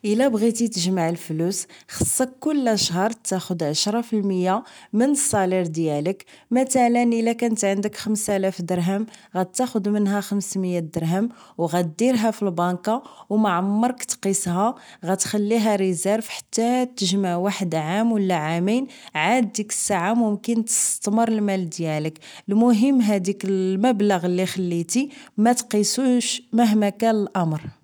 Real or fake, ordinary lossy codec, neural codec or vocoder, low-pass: real; none; none; none